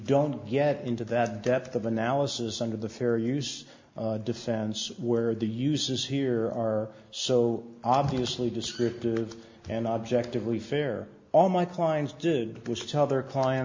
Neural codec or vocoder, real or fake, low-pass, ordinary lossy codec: none; real; 7.2 kHz; MP3, 48 kbps